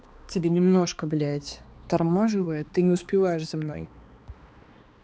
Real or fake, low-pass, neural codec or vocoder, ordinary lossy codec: fake; none; codec, 16 kHz, 2 kbps, X-Codec, HuBERT features, trained on balanced general audio; none